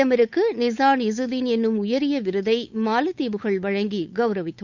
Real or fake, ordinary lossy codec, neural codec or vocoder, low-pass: fake; none; codec, 16 kHz, 8 kbps, FunCodec, trained on Chinese and English, 25 frames a second; 7.2 kHz